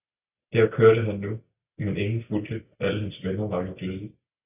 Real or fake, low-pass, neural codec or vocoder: real; 3.6 kHz; none